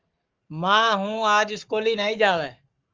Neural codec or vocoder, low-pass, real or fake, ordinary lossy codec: codec, 44.1 kHz, 7.8 kbps, Pupu-Codec; 7.2 kHz; fake; Opus, 32 kbps